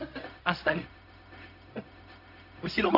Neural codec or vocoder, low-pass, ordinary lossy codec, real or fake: codec, 16 kHz, 0.4 kbps, LongCat-Audio-Codec; 5.4 kHz; none; fake